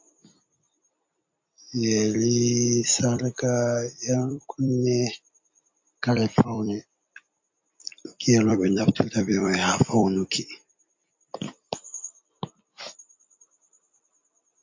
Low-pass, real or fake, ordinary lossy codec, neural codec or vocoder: 7.2 kHz; fake; MP3, 48 kbps; vocoder, 44.1 kHz, 128 mel bands every 256 samples, BigVGAN v2